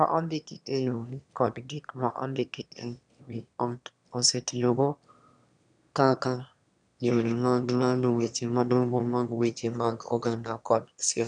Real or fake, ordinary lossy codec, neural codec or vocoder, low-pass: fake; none; autoencoder, 22.05 kHz, a latent of 192 numbers a frame, VITS, trained on one speaker; 9.9 kHz